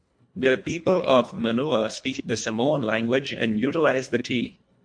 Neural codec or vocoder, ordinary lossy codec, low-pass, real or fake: codec, 24 kHz, 1.5 kbps, HILCodec; MP3, 64 kbps; 9.9 kHz; fake